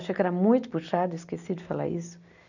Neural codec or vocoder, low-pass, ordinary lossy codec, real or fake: none; 7.2 kHz; none; real